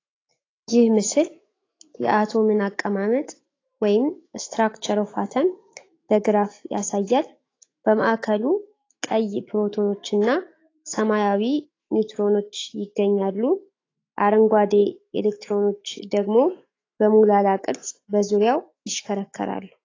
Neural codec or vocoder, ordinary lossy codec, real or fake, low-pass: autoencoder, 48 kHz, 128 numbers a frame, DAC-VAE, trained on Japanese speech; AAC, 32 kbps; fake; 7.2 kHz